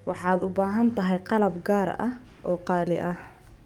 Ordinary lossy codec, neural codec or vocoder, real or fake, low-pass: Opus, 32 kbps; codec, 44.1 kHz, 7.8 kbps, DAC; fake; 19.8 kHz